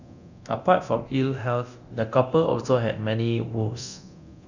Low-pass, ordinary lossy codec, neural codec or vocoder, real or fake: 7.2 kHz; none; codec, 24 kHz, 0.9 kbps, DualCodec; fake